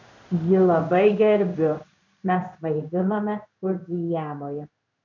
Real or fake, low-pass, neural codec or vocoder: fake; 7.2 kHz; codec, 16 kHz in and 24 kHz out, 1 kbps, XY-Tokenizer